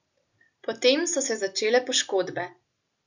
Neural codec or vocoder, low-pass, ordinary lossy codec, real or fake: none; 7.2 kHz; none; real